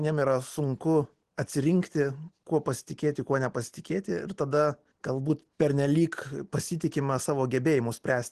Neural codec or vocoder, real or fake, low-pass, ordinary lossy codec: none; real; 10.8 kHz; Opus, 16 kbps